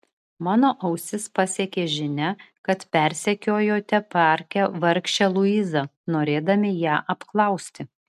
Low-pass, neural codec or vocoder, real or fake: 14.4 kHz; none; real